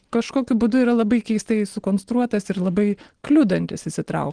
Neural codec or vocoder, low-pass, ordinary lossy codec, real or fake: none; 9.9 kHz; Opus, 16 kbps; real